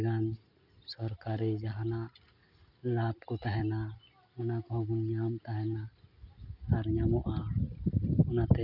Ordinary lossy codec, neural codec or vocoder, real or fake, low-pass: none; none; real; 5.4 kHz